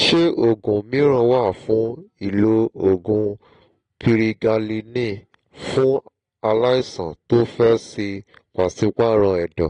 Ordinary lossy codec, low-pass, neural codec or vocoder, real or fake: AAC, 32 kbps; 9.9 kHz; none; real